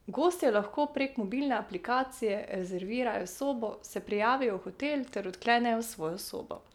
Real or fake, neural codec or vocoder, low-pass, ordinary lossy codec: real; none; 19.8 kHz; none